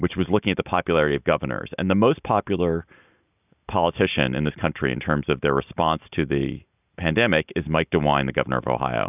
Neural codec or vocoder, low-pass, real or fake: none; 3.6 kHz; real